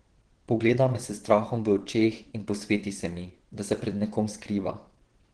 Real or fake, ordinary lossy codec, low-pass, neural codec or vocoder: fake; Opus, 16 kbps; 9.9 kHz; vocoder, 22.05 kHz, 80 mel bands, WaveNeXt